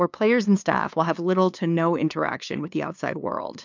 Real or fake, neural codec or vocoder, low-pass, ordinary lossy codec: fake; codec, 16 kHz, 2 kbps, FunCodec, trained on LibriTTS, 25 frames a second; 7.2 kHz; MP3, 64 kbps